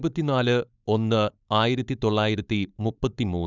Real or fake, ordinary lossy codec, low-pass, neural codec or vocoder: fake; none; 7.2 kHz; codec, 16 kHz, 4.8 kbps, FACodec